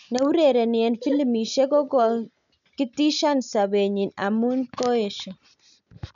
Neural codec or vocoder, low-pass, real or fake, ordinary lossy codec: none; 7.2 kHz; real; none